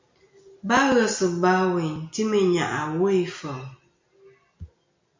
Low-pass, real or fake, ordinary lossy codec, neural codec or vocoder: 7.2 kHz; real; MP3, 48 kbps; none